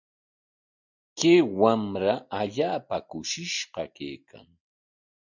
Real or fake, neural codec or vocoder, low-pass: real; none; 7.2 kHz